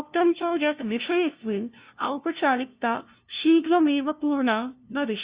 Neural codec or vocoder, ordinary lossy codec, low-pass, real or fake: codec, 16 kHz, 0.5 kbps, FunCodec, trained on LibriTTS, 25 frames a second; Opus, 64 kbps; 3.6 kHz; fake